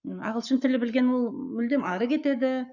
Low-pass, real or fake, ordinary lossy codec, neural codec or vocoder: 7.2 kHz; fake; none; codec, 44.1 kHz, 7.8 kbps, Pupu-Codec